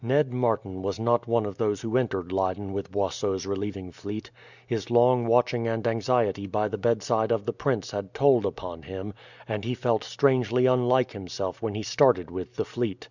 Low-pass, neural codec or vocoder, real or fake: 7.2 kHz; none; real